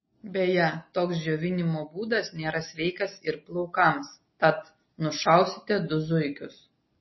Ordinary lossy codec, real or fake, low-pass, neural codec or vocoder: MP3, 24 kbps; real; 7.2 kHz; none